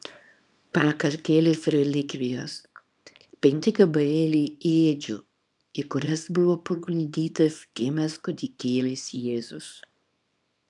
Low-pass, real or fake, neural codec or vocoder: 10.8 kHz; fake; codec, 24 kHz, 0.9 kbps, WavTokenizer, small release